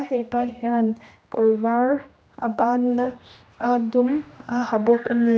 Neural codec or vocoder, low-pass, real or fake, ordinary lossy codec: codec, 16 kHz, 1 kbps, X-Codec, HuBERT features, trained on general audio; none; fake; none